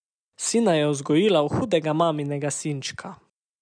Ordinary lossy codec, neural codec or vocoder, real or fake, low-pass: none; none; real; 9.9 kHz